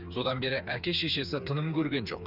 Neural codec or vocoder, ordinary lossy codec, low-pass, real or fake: codec, 16 kHz, 4 kbps, FreqCodec, smaller model; none; 5.4 kHz; fake